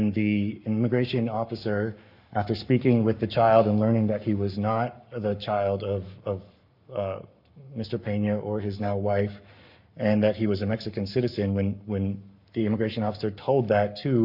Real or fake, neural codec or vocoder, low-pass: fake; codec, 44.1 kHz, 7.8 kbps, Pupu-Codec; 5.4 kHz